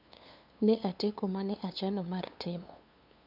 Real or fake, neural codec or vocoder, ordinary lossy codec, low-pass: fake; codec, 16 kHz, 2 kbps, FunCodec, trained on LibriTTS, 25 frames a second; none; 5.4 kHz